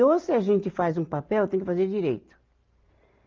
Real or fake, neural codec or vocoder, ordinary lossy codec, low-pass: real; none; Opus, 24 kbps; 7.2 kHz